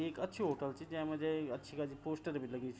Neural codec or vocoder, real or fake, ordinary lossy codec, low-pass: none; real; none; none